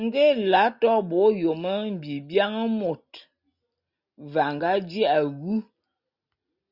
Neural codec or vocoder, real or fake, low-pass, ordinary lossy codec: none; real; 5.4 kHz; Opus, 64 kbps